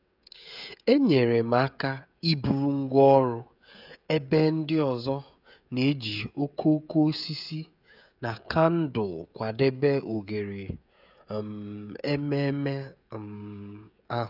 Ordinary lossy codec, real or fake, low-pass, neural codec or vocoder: none; fake; 5.4 kHz; codec, 16 kHz, 16 kbps, FreqCodec, smaller model